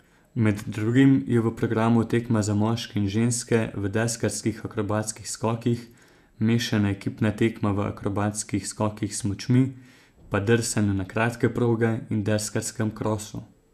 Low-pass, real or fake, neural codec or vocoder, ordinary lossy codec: 14.4 kHz; fake; vocoder, 48 kHz, 128 mel bands, Vocos; none